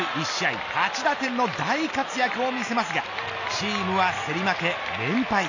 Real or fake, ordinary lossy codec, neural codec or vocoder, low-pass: real; none; none; 7.2 kHz